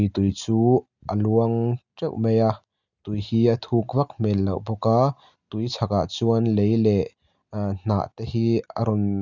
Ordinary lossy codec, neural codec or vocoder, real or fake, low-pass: none; none; real; 7.2 kHz